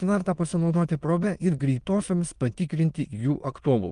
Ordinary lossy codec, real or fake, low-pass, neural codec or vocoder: Opus, 32 kbps; fake; 9.9 kHz; autoencoder, 22.05 kHz, a latent of 192 numbers a frame, VITS, trained on many speakers